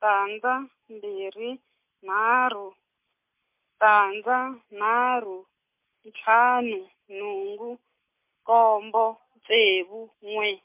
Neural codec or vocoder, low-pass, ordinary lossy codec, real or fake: none; 3.6 kHz; MP3, 32 kbps; real